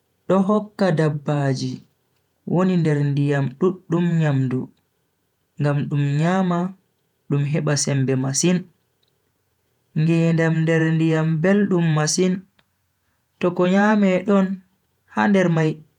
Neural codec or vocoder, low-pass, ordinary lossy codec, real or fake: vocoder, 48 kHz, 128 mel bands, Vocos; 19.8 kHz; none; fake